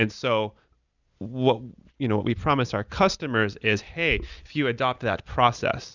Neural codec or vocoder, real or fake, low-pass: none; real; 7.2 kHz